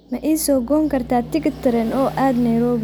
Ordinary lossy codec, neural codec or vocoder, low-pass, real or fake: none; none; none; real